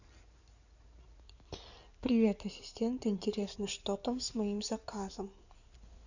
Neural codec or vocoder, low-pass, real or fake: codec, 16 kHz in and 24 kHz out, 2.2 kbps, FireRedTTS-2 codec; 7.2 kHz; fake